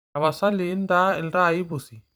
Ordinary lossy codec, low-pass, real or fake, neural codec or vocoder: none; none; fake; vocoder, 44.1 kHz, 128 mel bands every 256 samples, BigVGAN v2